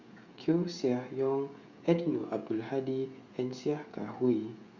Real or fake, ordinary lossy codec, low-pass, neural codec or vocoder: fake; Opus, 64 kbps; 7.2 kHz; codec, 16 kHz, 16 kbps, FreqCodec, smaller model